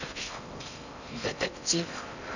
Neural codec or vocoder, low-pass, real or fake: codec, 16 kHz in and 24 kHz out, 0.6 kbps, FocalCodec, streaming, 4096 codes; 7.2 kHz; fake